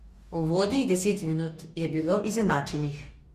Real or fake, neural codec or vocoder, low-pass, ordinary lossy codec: fake; codec, 44.1 kHz, 2.6 kbps, DAC; 14.4 kHz; Opus, 64 kbps